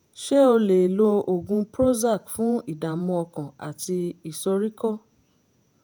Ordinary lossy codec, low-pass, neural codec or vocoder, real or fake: none; none; vocoder, 48 kHz, 128 mel bands, Vocos; fake